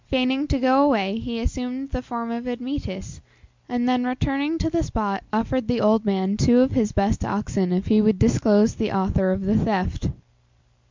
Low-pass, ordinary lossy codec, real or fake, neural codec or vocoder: 7.2 kHz; MP3, 64 kbps; real; none